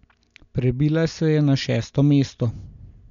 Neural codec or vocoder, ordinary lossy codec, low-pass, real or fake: none; none; 7.2 kHz; real